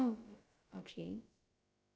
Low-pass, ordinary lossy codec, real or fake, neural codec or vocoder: none; none; fake; codec, 16 kHz, about 1 kbps, DyCAST, with the encoder's durations